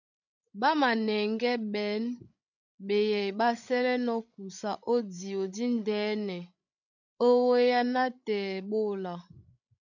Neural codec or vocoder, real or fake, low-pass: codec, 16 kHz, 16 kbps, FreqCodec, larger model; fake; 7.2 kHz